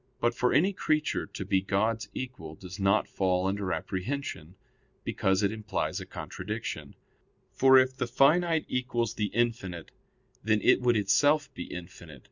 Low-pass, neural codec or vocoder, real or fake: 7.2 kHz; none; real